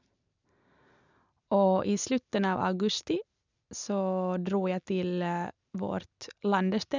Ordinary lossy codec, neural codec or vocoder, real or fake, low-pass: none; none; real; 7.2 kHz